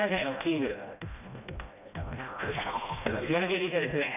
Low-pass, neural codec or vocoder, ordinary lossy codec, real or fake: 3.6 kHz; codec, 16 kHz, 1 kbps, FreqCodec, smaller model; none; fake